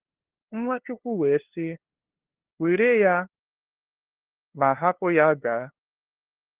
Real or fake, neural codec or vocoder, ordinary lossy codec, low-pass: fake; codec, 16 kHz, 2 kbps, FunCodec, trained on LibriTTS, 25 frames a second; Opus, 16 kbps; 3.6 kHz